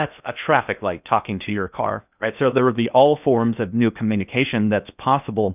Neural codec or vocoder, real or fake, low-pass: codec, 16 kHz in and 24 kHz out, 0.6 kbps, FocalCodec, streaming, 2048 codes; fake; 3.6 kHz